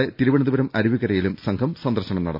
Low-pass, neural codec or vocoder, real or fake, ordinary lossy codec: 5.4 kHz; none; real; none